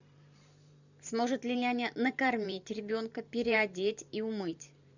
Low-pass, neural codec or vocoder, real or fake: 7.2 kHz; vocoder, 44.1 kHz, 128 mel bands every 512 samples, BigVGAN v2; fake